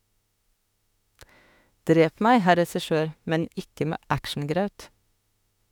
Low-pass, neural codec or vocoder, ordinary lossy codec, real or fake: 19.8 kHz; autoencoder, 48 kHz, 32 numbers a frame, DAC-VAE, trained on Japanese speech; none; fake